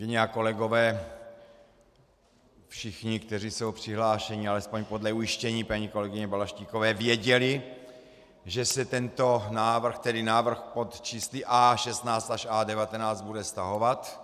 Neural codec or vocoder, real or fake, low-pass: none; real; 14.4 kHz